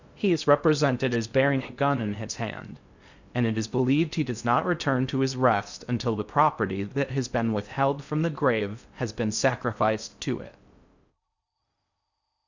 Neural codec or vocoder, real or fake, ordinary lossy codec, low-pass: codec, 16 kHz in and 24 kHz out, 0.6 kbps, FocalCodec, streaming, 2048 codes; fake; Opus, 64 kbps; 7.2 kHz